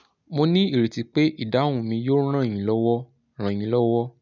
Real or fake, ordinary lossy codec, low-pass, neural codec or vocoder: real; none; 7.2 kHz; none